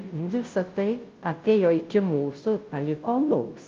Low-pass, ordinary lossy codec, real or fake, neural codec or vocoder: 7.2 kHz; Opus, 32 kbps; fake; codec, 16 kHz, 0.5 kbps, FunCodec, trained on Chinese and English, 25 frames a second